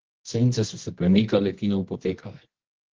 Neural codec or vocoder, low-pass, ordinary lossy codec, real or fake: codec, 24 kHz, 0.9 kbps, WavTokenizer, medium music audio release; 7.2 kHz; Opus, 16 kbps; fake